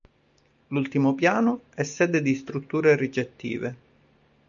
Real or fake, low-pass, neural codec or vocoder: real; 7.2 kHz; none